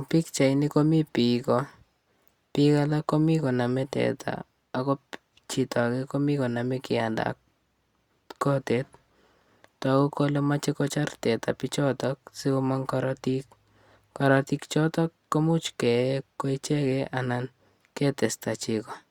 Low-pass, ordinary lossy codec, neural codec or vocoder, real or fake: 19.8 kHz; Opus, 64 kbps; none; real